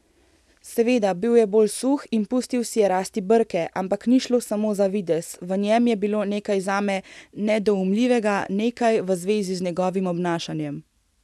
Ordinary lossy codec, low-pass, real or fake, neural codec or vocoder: none; none; real; none